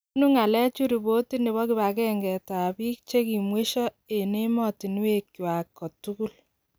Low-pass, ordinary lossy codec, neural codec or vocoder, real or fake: none; none; none; real